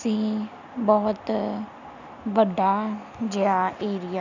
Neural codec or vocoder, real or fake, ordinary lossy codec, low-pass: none; real; none; 7.2 kHz